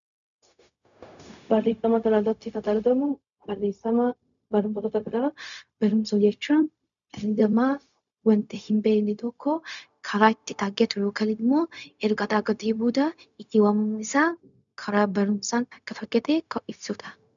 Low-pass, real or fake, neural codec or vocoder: 7.2 kHz; fake; codec, 16 kHz, 0.4 kbps, LongCat-Audio-Codec